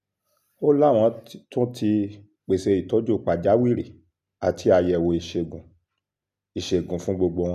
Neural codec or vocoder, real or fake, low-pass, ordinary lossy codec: none; real; 14.4 kHz; none